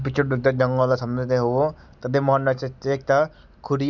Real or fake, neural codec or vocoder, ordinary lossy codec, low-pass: real; none; none; 7.2 kHz